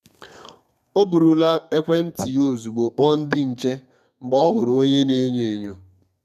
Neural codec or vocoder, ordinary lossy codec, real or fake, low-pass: codec, 32 kHz, 1.9 kbps, SNAC; none; fake; 14.4 kHz